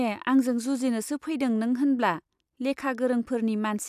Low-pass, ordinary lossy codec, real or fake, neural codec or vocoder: 14.4 kHz; none; real; none